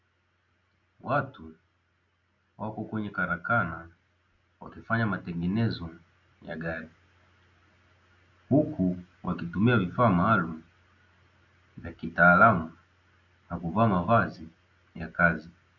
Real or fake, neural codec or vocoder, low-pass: real; none; 7.2 kHz